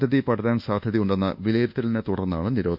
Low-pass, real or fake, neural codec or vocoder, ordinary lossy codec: 5.4 kHz; fake; codec, 24 kHz, 1.2 kbps, DualCodec; none